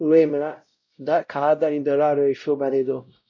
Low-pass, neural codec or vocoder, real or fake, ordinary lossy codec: 7.2 kHz; codec, 16 kHz, 0.5 kbps, X-Codec, WavLM features, trained on Multilingual LibriSpeech; fake; MP3, 48 kbps